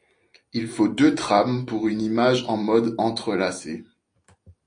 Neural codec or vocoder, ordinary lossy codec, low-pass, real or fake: none; AAC, 48 kbps; 9.9 kHz; real